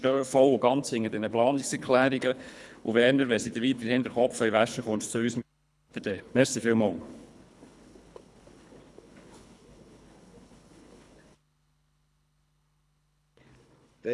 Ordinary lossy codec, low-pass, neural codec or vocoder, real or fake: none; none; codec, 24 kHz, 3 kbps, HILCodec; fake